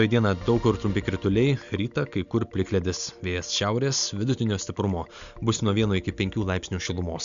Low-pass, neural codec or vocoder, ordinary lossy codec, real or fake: 7.2 kHz; none; Opus, 64 kbps; real